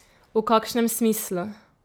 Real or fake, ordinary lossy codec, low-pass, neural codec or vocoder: real; none; none; none